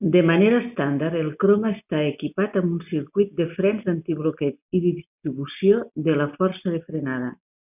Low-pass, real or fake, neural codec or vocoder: 3.6 kHz; real; none